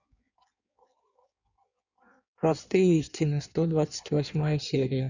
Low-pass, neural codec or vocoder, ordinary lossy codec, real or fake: 7.2 kHz; codec, 16 kHz in and 24 kHz out, 1.1 kbps, FireRedTTS-2 codec; none; fake